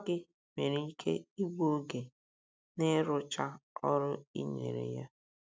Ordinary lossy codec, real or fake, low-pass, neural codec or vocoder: none; real; none; none